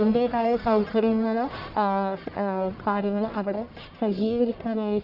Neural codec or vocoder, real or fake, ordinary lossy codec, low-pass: codec, 44.1 kHz, 1.7 kbps, Pupu-Codec; fake; none; 5.4 kHz